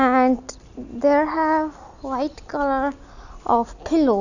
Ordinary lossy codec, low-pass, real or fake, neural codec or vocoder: none; 7.2 kHz; real; none